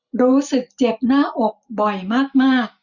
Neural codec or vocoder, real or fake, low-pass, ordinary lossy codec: none; real; 7.2 kHz; none